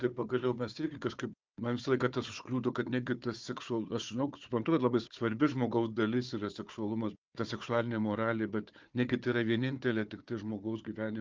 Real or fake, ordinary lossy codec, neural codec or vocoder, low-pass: fake; Opus, 32 kbps; codec, 16 kHz, 4 kbps, FunCodec, trained on Chinese and English, 50 frames a second; 7.2 kHz